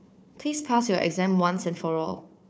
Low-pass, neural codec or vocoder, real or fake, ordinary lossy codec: none; codec, 16 kHz, 4 kbps, FunCodec, trained on Chinese and English, 50 frames a second; fake; none